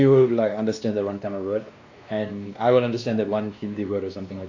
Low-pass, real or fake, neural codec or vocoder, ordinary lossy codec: 7.2 kHz; fake; codec, 16 kHz, 2 kbps, X-Codec, WavLM features, trained on Multilingual LibriSpeech; AAC, 48 kbps